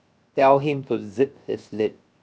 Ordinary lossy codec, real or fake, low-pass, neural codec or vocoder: none; fake; none; codec, 16 kHz, 0.7 kbps, FocalCodec